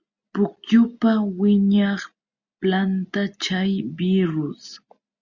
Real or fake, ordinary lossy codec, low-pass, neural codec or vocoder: real; Opus, 64 kbps; 7.2 kHz; none